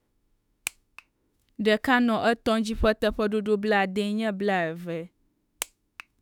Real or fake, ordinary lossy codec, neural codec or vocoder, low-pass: fake; none; autoencoder, 48 kHz, 32 numbers a frame, DAC-VAE, trained on Japanese speech; 19.8 kHz